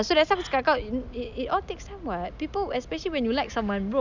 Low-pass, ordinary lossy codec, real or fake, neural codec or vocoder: 7.2 kHz; none; real; none